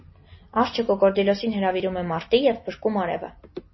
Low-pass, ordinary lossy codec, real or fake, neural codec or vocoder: 7.2 kHz; MP3, 24 kbps; real; none